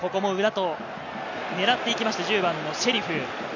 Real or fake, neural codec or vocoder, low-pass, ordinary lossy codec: real; none; 7.2 kHz; none